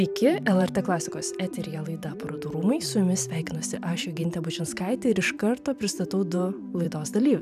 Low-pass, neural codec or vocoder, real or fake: 14.4 kHz; vocoder, 44.1 kHz, 128 mel bands every 512 samples, BigVGAN v2; fake